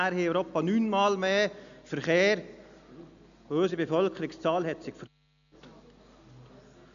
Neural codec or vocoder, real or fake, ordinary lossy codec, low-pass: none; real; none; 7.2 kHz